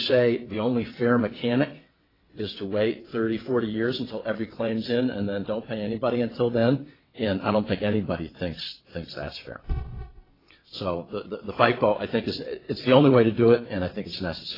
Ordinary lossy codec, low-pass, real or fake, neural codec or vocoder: AAC, 24 kbps; 5.4 kHz; fake; vocoder, 22.05 kHz, 80 mel bands, WaveNeXt